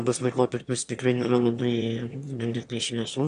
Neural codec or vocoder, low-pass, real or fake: autoencoder, 22.05 kHz, a latent of 192 numbers a frame, VITS, trained on one speaker; 9.9 kHz; fake